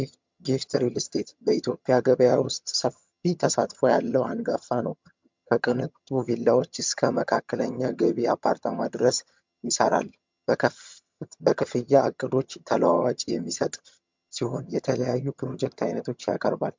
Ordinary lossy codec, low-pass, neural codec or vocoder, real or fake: MP3, 64 kbps; 7.2 kHz; vocoder, 22.05 kHz, 80 mel bands, HiFi-GAN; fake